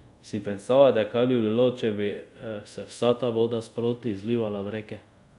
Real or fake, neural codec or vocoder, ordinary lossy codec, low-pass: fake; codec, 24 kHz, 0.5 kbps, DualCodec; none; 10.8 kHz